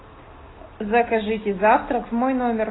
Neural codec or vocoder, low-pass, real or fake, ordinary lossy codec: none; 7.2 kHz; real; AAC, 16 kbps